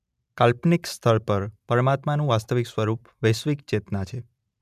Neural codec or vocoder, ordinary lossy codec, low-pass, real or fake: none; none; 14.4 kHz; real